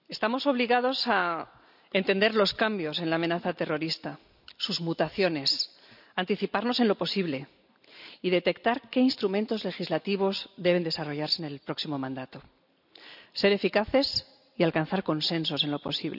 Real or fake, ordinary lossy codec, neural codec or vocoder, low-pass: real; none; none; 5.4 kHz